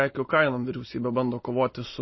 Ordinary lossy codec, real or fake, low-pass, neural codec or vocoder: MP3, 24 kbps; real; 7.2 kHz; none